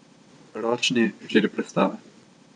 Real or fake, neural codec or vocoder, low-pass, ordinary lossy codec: fake; vocoder, 22.05 kHz, 80 mel bands, WaveNeXt; 9.9 kHz; none